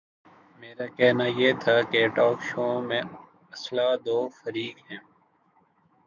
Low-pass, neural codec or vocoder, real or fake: 7.2 kHz; autoencoder, 48 kHz, 128 numbers a frame, DAC-VAE, trained on Japanese speech; fake